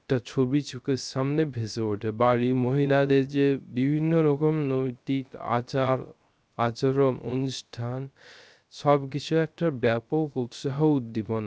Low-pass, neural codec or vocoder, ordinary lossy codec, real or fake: none; codec, 16 kHz, 0.3 kbps, FocalCodec; none; fake